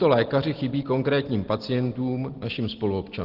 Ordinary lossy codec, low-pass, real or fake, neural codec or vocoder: Opus, 16 kbps; 5.4 kHz; real; none